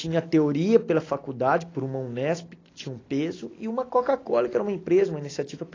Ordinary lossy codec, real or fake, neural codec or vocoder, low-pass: AAC, 32 kbps; real; none; 7.2 kHz